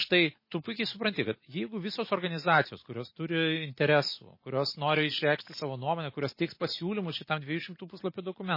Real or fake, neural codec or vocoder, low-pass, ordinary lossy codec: real; none; 5.4 kHz; MP3, 24 kbps